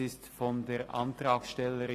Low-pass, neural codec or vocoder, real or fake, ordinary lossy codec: 14.4 kHz; none; real; AAC, 48 kbps